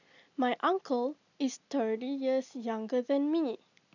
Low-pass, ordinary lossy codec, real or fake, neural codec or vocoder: 7.2 kHz; none; real; none